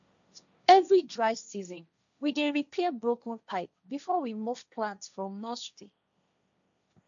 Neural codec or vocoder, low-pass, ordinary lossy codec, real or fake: codec, 16 kHz, 1.1 kbps, Voila-Tokenizer; 7.2 kHz; none; fake